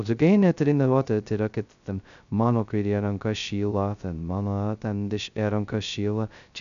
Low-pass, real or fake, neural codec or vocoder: 7.2 kHz; fake; codec, 16 kHz, 0.2 kbps, FocalCodec